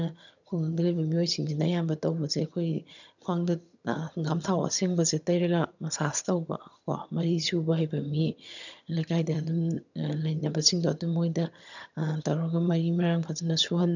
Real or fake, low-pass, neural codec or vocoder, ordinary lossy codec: fake; 7.2 kHz; vocoder, 22.05 kHz, 80 mel bands, HiFi-GAN; none